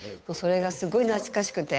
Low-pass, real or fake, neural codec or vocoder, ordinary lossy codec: none; fake; codec, 16 kHz, 8 kbps, FunCodec, trained on Chinese and English, 25 frames a second; none